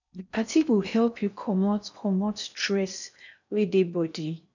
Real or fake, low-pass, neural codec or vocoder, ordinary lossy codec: fake; 7.2 kHz; codec, 16 kHz in and 24 kHz out, 0.6 kbps, FocalCodec, streaming, 4096 codes; none